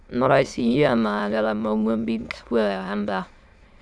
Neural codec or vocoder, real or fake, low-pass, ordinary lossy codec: autoencoder, 22.05 kHz, a latent of 192 numbers a frame, VITS, trained on many speakers; fake; none; none